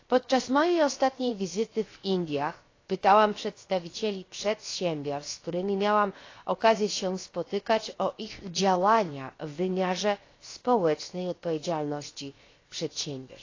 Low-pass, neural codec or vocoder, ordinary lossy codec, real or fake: 7.2 kHz; codec, 16 kHz, 0.7 kbps, FocalCodec; AAC, 32 kbps; fake